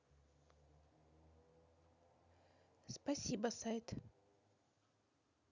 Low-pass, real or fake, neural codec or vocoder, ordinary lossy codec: 7.2 kHz; real; none; none